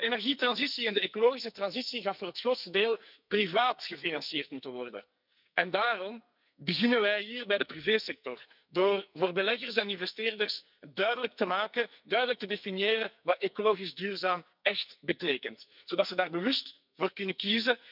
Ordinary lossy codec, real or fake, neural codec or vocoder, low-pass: none; fake; codec, 44.1 kHz, 2.6 kbps, SNAC; 5.4 kHz